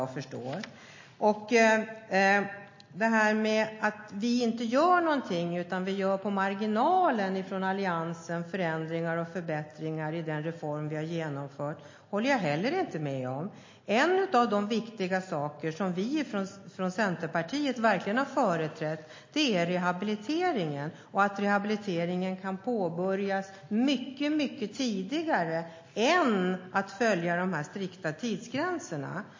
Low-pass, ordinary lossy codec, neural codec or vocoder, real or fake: 7.2 kHz; MP3, 32 kbps; none; real